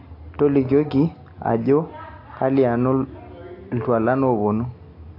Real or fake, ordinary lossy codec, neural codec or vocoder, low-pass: real; AAC, 32 kbps; none; 5.4 kHz